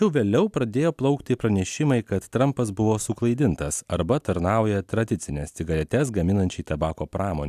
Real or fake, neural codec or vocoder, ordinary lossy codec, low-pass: real; none; AAC, 96 kbps; 14.4 kHz